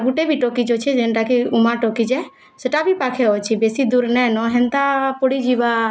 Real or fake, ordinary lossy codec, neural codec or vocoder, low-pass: real; none; none; none